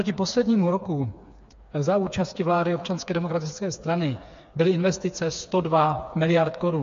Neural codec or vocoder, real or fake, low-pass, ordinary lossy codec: codec, 16 kHz, 4 kbps, FreqCodec, smaller model; fake; 7.2 kHz; MP3, 48 kbps